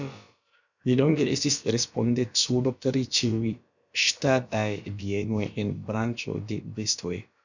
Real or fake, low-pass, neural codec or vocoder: fake; 7.2 kHz; codec, 16 kHz, about 1 kbps, DyCAST, with the encoder's durations